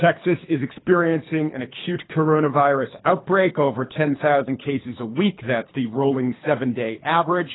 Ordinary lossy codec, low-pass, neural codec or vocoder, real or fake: AAC, 16 kbps; 7.2 kHz; codec, 24 kHz, 3 kbps, HILCodec; fake